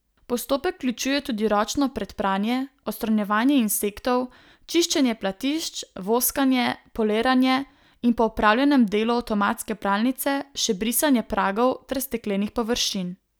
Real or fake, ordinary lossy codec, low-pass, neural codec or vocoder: real; none; none; none